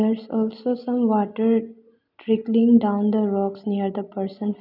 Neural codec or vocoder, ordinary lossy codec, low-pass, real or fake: none; none; 5.4 kHz; real